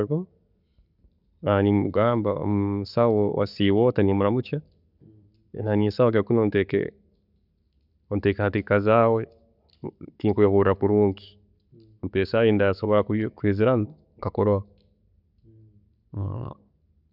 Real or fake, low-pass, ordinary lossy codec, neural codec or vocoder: real; 5.4 kHz; none; none